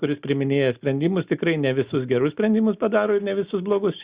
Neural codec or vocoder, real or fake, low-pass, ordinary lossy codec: none; real; 3.6 kHz; Opus, 64 kbps